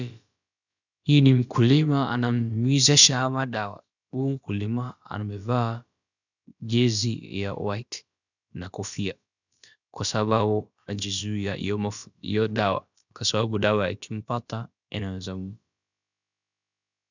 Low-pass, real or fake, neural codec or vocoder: 7.2 kHz; fake; codec, 16 kHz, about 1 kbps, DyCAST, with the encoder's durations